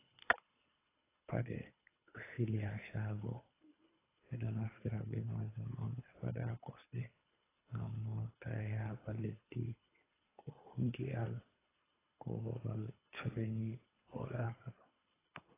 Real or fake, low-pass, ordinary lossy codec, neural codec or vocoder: fake; 3.6 kHz; AAC, 16 kbps; codec, 24 kHz, 3 kbps, HILCodec